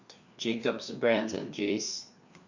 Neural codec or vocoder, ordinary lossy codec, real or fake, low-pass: codec, 16 kHz, 2 kbps, FreqCodec, larger model; Opus, 64 kbps; fake; 7.2 kHz